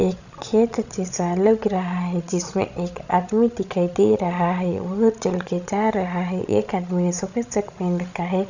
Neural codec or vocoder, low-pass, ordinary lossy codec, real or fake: codec, 16 kHz, 16 kbps, FreqCodec, larger model; 7.2 kHz; none; fake